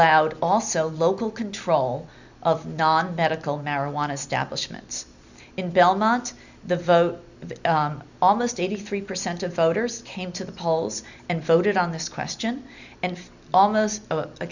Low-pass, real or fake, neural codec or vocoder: 7.2 kHz; real; none